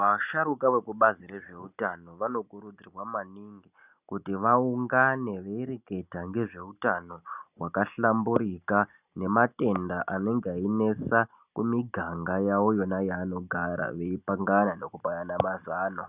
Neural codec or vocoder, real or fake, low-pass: none; real; 3.6 kHz